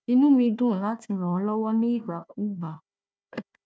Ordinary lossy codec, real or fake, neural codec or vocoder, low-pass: none; fake; codec, 16 kHz, 1 kbps, FunCodec, trained on Chinese and English, 50 frames a second; none